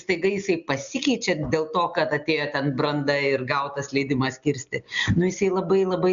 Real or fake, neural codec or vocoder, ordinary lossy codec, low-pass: real; none; MP3, 96 kbps; 7.2 kHz